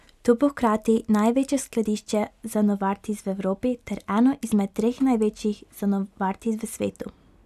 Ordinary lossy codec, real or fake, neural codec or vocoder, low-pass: none; real; none; 14.4 kHz